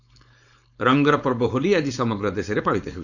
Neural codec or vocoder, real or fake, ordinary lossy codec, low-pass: codec, 16 kHz, 4.8 kbps, FACodec; fake; none; 7.2 kHz